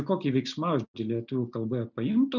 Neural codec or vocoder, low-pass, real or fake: none; 7.2 kHz; real